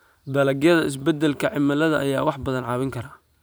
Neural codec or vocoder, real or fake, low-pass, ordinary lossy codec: vocoder, 44.1 kHz, 128 mel bands every 512 samples, BigVGAN v2; fake; none; none